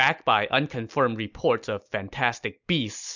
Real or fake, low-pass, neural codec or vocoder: real; 7.2 kHz; none